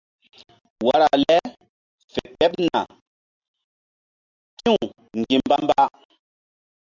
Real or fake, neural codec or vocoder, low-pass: real; none; 7.2 kHz